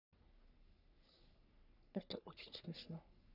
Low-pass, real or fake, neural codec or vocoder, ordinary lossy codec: 5.4 kHz; fake; codec, 44.1 kHz, 3.4 kbps, Pupu-Codec; none